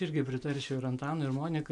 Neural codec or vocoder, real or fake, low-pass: none; real; 10.8 kHz